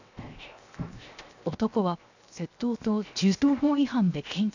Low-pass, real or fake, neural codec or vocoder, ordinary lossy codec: 7.2 kHz; fake; codec, 16 kHz, 0.7 kbps, FocalCodec; none